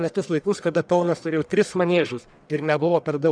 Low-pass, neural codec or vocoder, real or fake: 9.9 kHz; codec, 44.1 kHz, 1.7 kbps, Pupu-Codec; fake